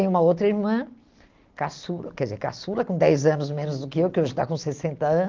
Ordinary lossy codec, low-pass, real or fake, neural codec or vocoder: Opus, 32 kbps; 7.2 kHz; real; none